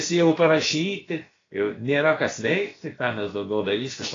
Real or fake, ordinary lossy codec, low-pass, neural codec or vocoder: fake; AAC, 32 kbps; 7.2 kHz; codec, 16 kHz, about 1 kbps, DyCAST, with the encoder's durations